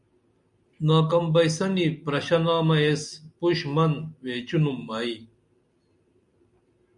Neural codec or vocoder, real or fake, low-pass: none; real; 10.8 kHz